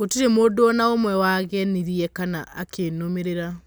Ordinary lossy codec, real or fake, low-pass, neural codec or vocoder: none; real; none; none